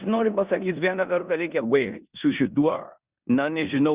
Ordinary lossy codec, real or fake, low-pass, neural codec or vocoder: Opus, 64 kbps; fake; 3.6 kHz; codec, 16 kHz in and 24 kHz out, 0.9 kbps, LongCat-Audio-Codec, four codebook decoder